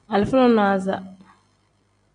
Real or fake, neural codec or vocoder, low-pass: real; none; 9.9 kHz